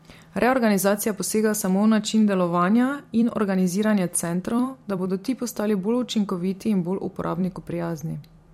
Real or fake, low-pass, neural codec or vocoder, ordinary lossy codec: fake; 19.8 kHz; vocoder, 44.1 kHz, 128 mel bands every 256 samples, BigVGAN v2; MP3, 64 kbps